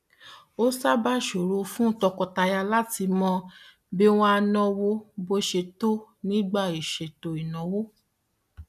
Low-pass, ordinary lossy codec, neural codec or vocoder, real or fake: 14.4 kHz; none; none; real